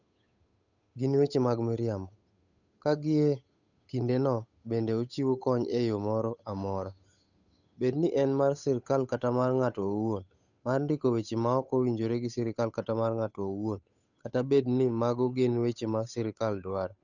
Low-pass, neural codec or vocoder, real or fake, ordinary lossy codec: 7.2 kHz; codec, 16 kHz, 8 kbps, FunCodec, trained on Chinese and English, 25 frames a second; fake; none